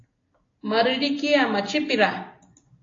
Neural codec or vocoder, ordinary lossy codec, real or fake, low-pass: none; AAC, 32 kbps; real; 7.2 kHz